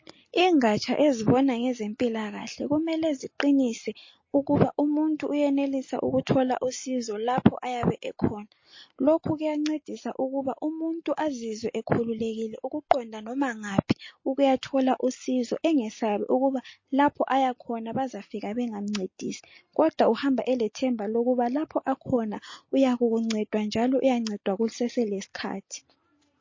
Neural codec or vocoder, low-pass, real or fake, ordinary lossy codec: none; 7.2 kHz; real; MP3, 32 kbps